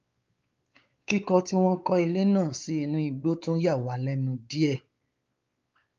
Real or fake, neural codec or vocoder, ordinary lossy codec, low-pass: fake; codec, 16 kHz, 4 kbps, X-Codec, WavLM features, trained on Multilingual LibriSpeech; Opus, 32 kbps; 7.2 kHz